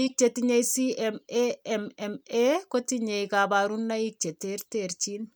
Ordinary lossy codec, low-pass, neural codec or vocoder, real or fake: none; none; none; real